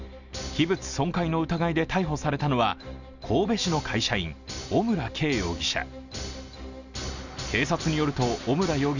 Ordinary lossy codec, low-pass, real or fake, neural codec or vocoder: none; 7.2 kHz; real; none